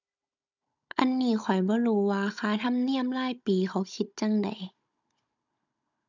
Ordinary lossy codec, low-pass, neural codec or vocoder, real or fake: none; 7.2 kHz; codec, 16 kHz, 16 kbps, FunCodec, trained on Chinese and English, 50 frames a second; fake